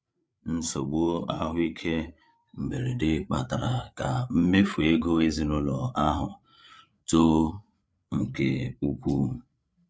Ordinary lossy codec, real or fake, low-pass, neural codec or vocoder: none; fake; none; codec, 16 kHz, 8 kbps, FreqCodec, larger model